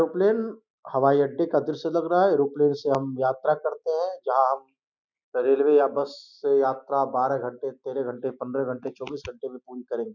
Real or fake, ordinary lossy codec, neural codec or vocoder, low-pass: real; none; none; 7.2 kHz